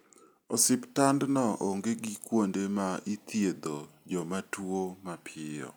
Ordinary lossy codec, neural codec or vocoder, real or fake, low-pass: none; none; real; none